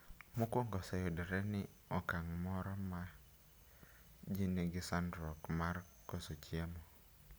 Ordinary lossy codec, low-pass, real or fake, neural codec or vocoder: none; none; real; none